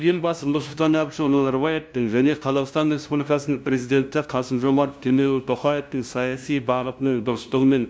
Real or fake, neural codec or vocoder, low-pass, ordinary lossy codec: fake; codec, 16 kHz, 0.5 kbps, FunCodec, trained on LibriTTS, 25 frames a second; none; none